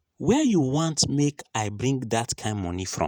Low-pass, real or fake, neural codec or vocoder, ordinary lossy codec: none; fake; vocoder, 48 kHz, 128 mel bands, Vocos; none